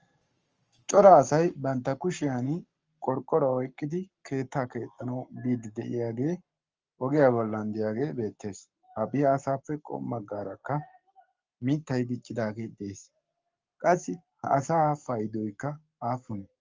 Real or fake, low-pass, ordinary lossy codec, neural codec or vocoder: fake; 7.2 kHz; Opus, 24 kbps; codec, 44.1 kHz, 7.8 kbps, Pupu-Codec